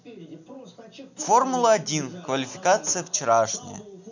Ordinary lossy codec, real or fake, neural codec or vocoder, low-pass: none; real; none; 7.2 kHz